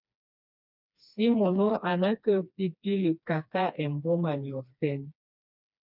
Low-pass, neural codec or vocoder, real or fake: 5.4 kHz; codec, 16 kHz, 2 kbps, FreqCodec, smaller model; fake